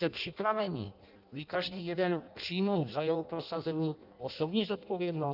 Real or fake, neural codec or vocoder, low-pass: fake; codec, 16 kHz in and 24 kHz out, 0.6 kbps, FireRedTTS-2 codec; 5.4 kHz